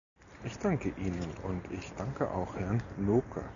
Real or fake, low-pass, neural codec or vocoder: real; 7.2 kHz; none